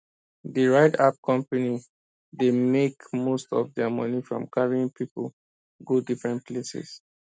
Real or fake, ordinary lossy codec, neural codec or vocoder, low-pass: real; none; none; none